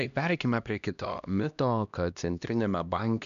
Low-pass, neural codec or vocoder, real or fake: 7.2 kHz; codec, 16 kHz, 1 kbps, X-Codec, HuBERT features, trained on LibriSpeech; fake